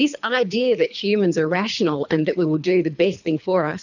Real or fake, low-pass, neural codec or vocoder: fake; 7.2 kHz; codec, 24 kHz, 3 kbps, HILCodec